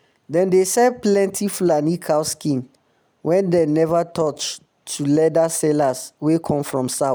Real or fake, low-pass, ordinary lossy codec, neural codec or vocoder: real; none; none; none